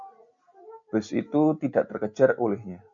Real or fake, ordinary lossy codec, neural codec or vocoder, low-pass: real; MP3, 64 kbps; none; 7.2 kHz